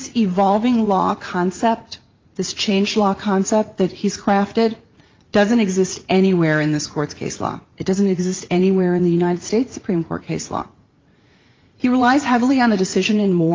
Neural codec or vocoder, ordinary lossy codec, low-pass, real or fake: vocoder, 44.1 kHz, 80 mel bands, Vocos; Opus, 32 kbps; 7.2 kHz; fake